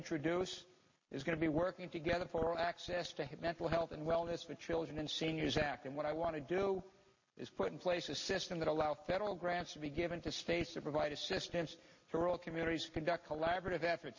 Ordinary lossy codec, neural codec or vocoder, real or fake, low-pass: MP3, 32 kbps; none; real; 7.2 kHz